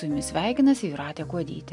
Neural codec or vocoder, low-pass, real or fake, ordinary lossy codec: none; 10.8 kHz; real; MP3, 64 kbps